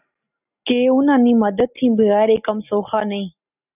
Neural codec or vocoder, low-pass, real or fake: none; 3.6 kHz; real